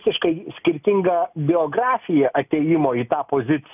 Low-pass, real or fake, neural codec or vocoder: 3.6 kHz; real; none